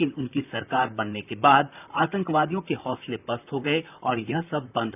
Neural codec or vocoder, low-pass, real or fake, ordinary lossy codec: vocoder, 44.1 kHz, 128 mel bands, Pupu-Vocoder; 3.6 kHz; fake; none